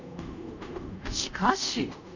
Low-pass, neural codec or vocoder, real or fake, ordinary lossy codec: 7.2 kHz; codec, 24 kHz, 0.5 kbps, DualCodec; fake; none